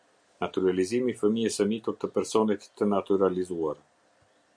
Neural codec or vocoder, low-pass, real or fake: none; 9.9 kHz; real